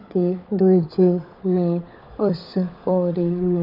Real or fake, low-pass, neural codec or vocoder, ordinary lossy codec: fake; 5.4 kHz; codec, 16 kHz, 16 kbps, FunCodec, trained on LibriTTS, 50 frames a second; none